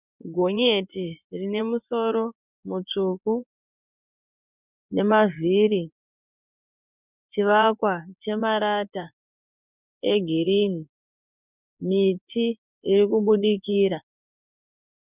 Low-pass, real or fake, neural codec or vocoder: 3.6 kHz; fake; vocoder, 24 kHz, 100 mel bands, Vocos